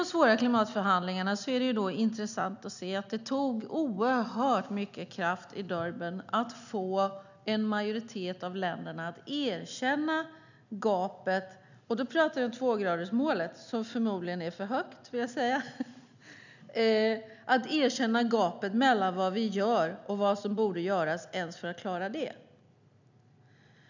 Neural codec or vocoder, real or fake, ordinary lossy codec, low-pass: none; real; none; 7.2 kHz